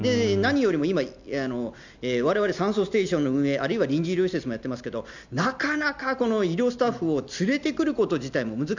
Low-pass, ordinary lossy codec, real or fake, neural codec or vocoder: 7.2 kHz; none; real; none